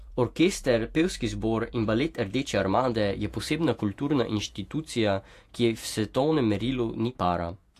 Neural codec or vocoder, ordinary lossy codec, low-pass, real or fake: vocoder, 48 kHz, 128 mel bands, Vocos; AAC, 64 kbps; 14.4 kHz; fake